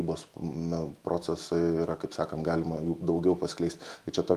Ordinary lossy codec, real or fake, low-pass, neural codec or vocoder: Opus, 32 kbps; fake; 14.4 kHz; autoencoder, 48 kHz, 128 numbers a frame, DAC-VAE, trained on Japanese speech